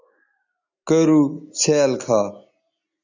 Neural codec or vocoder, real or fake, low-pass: none; real; 7.2 kHz